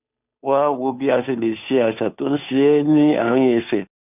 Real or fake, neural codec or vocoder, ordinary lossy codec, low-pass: fake; codec, 16 kHz, 2 kbps, FunCodec, trained on Chinese and English, 25 frames a second; none; 3.6 kHz